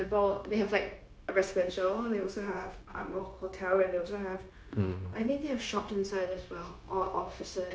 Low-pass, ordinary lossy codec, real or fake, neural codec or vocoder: none; none; fake; codec, 16 kHz, 0.9 kbps, LongCat-Audio-Codec